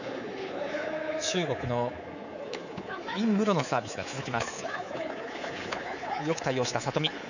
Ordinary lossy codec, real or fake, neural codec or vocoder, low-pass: none; fake; codec, 24 kHz, 3.1 kbps, DualCodec; 7.2 kHz